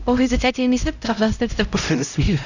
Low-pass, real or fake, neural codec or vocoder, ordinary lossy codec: 7.2 kHz; fake; codec, 16 kHz, 0.5 kbps, X-Codec, HuBERT features, trained on LibriSpeech; none